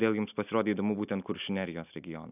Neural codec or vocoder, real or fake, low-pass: none; real; 3.6 kHz